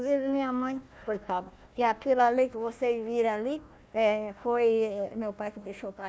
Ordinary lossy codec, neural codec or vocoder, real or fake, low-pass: none; codec, 16 kHz, 1 kbps, FunCodec, trained on Chinese and English, 50 frames a second; fake; none